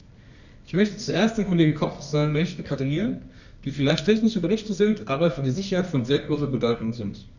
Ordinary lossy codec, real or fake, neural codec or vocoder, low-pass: none; fake; codec, 24 kHz, 0.9 kbps, WavTokenizer, medium music audio release; 7.2 kHz